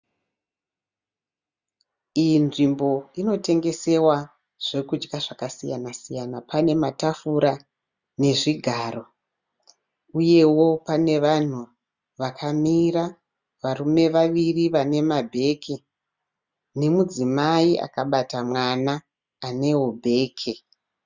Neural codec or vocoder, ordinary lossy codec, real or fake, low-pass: none; Opus, 64 kbps; real; 7.2 kHz